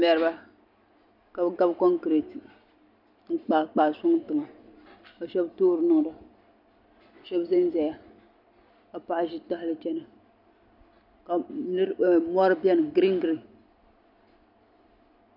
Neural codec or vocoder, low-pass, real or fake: none; 5.4 kHz; real